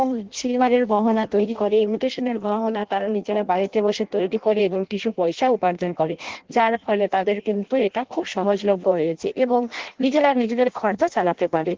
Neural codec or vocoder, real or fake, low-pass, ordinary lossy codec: codec, 16 kHz in and 24 kHz out, 0.6 kbps, FireRedTTS-2 codec; fake; 7.2 kHz; Opus, 16 kbps